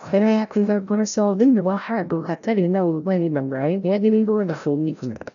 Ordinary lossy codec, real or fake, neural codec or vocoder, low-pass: none; fake; codec, 16 kHz, 0.5 kbps, FreqCodec, larger model; 7.2 kHz